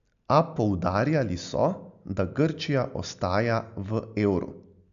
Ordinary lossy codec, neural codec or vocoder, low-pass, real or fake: none; none; 7.2 kHz; real